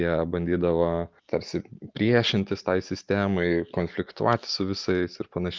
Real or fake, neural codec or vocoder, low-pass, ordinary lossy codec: real; none; 7.2 kHz; Opus, 24 kbps